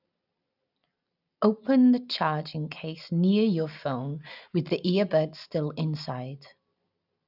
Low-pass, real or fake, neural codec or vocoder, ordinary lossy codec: 5.4 kHz; real; none; none